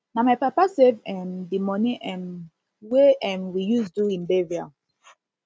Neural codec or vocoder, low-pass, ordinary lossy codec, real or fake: none; none; none; real